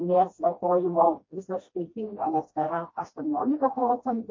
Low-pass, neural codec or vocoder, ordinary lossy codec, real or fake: 7.2 kHz; codec, 16 kHz, 1 kbps, FreqCodec, smaller model; MP3, 32 kbps; fake